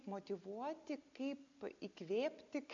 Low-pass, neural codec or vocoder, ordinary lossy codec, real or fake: 7.2 kHz; none; AAC, 64 kbps; real